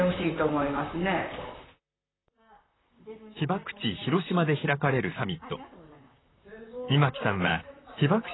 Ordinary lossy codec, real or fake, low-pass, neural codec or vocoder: AAC, 16 kbps; fake; 7.2 kHz; vocoder, 44.1 kHz, 128 mel bands, Pupu-Vocoder